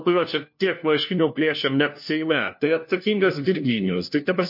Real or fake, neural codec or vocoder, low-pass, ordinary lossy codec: fake; codec, 16 kHz, 1 kbps, FunCodec, trained on LibriTTS, 50 frames a second; 5.4 kHz; MP3, 32 kbps